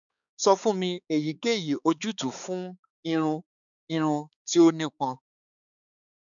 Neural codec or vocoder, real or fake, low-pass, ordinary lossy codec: codec, 16 kHz, 4 kbps, X-Codec, HuBERT features, trained on balanced general audio; fake; 7.2 kHz; none